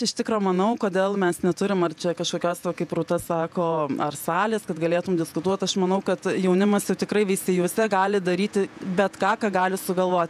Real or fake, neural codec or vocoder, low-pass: fake; vocoder, 48 kHz, 128 mel bands, Vocos; 14.4 kHz